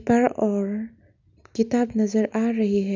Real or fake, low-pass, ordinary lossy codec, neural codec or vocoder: real; 7.2 kHz; none; none